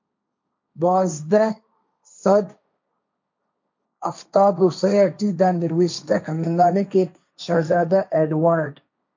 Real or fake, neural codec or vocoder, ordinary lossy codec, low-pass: fake; codec, 16 kHz, 1.1 kbps, Voila-Tokenizer; MP3, 64 kbps; 7.2 kHz